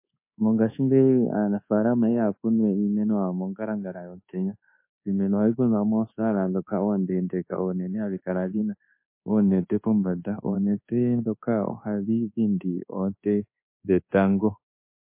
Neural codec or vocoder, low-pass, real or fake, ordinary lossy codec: codec, 24 kHz, 1.2 kbps, DualCodec; 3.6 kHz; fake; MP3, 24 kbps